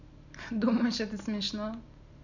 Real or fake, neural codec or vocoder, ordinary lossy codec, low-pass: real; none; none; 7.2 kHz